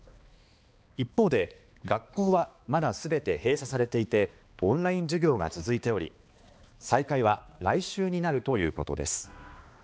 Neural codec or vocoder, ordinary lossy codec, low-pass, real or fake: codec, 16 kHz, 2 kbps, X-Codec, HuBERT features, trained on balanced general audio; none; none; fake